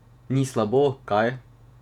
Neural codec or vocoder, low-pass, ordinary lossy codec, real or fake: vocoder, 48 kHz, 128 mel bands, Vocos; 19.8 kHz; none; fake